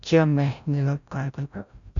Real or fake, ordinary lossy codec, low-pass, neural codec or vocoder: fake; none; 7.2 kHz; codec, 16 kHz, 0.5 kbps, FreqCodec, larger model